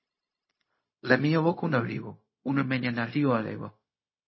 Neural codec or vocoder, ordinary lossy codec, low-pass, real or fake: codec, 16 kHz, 0.4 kbps, LongCat-Audio-Codec; MP3, 24 kbps; 7.2 kHz; fake